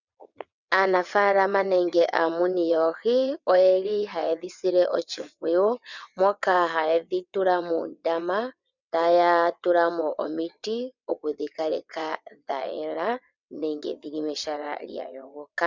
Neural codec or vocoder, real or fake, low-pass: vocoder, 22.05 kHz, 80 mel bands, WaveNeXt; fake; 7.2 kHz